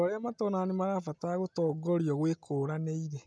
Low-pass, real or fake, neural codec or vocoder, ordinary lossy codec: none; real; none; none